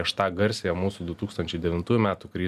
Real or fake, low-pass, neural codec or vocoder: real; 14.4 kHz; none